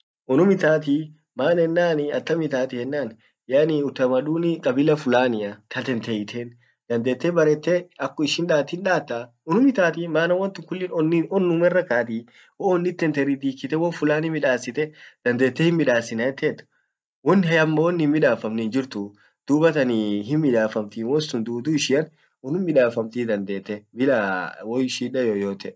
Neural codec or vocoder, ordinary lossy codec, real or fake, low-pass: none; none; real; none